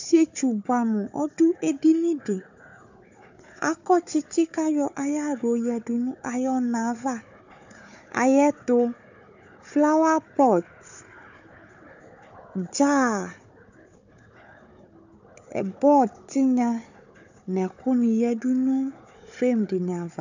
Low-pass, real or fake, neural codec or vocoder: 7.2 kHz; fake; codec, 16 kHz, 4 kbps, FunCodec, trained on Chinese and English, 50 frames a second